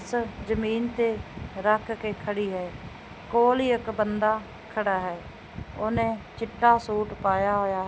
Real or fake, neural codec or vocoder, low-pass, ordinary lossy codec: real; none; none; none